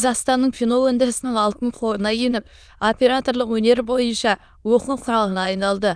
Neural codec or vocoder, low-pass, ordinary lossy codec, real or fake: autoencoder, 22.05 kHz, a latent of 192 numbers a frame, VITS, trained on many speakers; none; none; fake